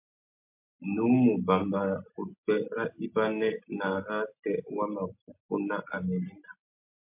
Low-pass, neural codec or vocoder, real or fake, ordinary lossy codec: 3.6 kHz; none; real; MP3, 32 kbps